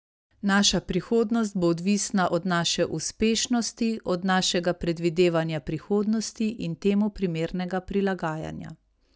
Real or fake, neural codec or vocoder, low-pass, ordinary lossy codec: real; none; none; none